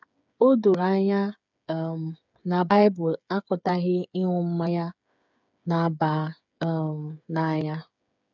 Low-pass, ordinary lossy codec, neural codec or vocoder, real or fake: 7.2 kHz; none; codec, 16 kHz, 8 kbps, FreqCodec, smaller model; fake